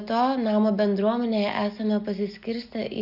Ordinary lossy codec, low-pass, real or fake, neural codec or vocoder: AAC, 48 kbps; 5.4 kHz; real; none